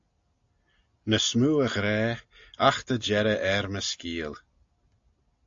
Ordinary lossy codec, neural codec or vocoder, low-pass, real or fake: AAC, 64 kbps; none; 7.2 kHz; real